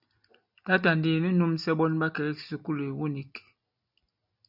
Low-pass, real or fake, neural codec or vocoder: 5.4 kHz; real; none